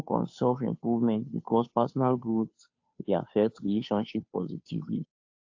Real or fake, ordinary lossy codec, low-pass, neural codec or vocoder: fake; AAC, 48 kbps; 7.2 kHz; codec, 16 kHz, 2 kbps, FunCodec, trained on Chinese and English, 25 frames a second